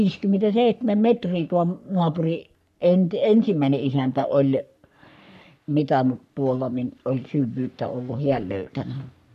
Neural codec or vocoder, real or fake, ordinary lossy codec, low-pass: codec, 44.1 kHz, 3.4 kbps, Pupu-Codec; fake; none; 14.4 kHz